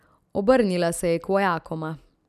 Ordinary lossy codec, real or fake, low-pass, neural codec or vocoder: none; real; 14.4 kHz; none